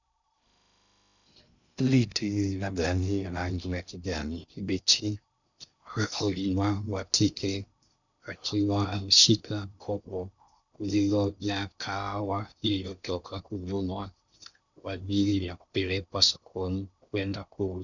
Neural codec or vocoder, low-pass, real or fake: codec, 16 kHz in and 24 kHz out, 0.6 kbps, FocalCodec, streaming, 2048 codes; 7.2 kHz; fake